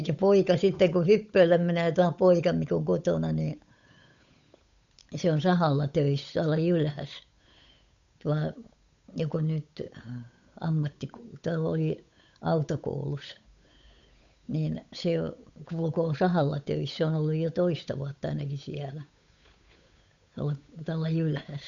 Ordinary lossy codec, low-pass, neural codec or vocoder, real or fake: none; 7.2 kHz; codec, 16 kHz, 8 kbps, FunCodec, trained on Chinese and English, 25 frames a second; fake